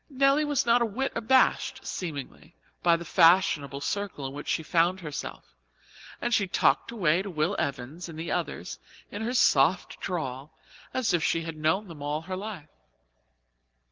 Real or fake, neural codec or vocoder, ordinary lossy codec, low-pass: real; none; Opus, 16 kbps; 7.2 kHz